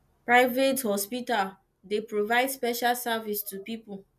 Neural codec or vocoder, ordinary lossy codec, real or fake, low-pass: none; none; real; 14.4 kHz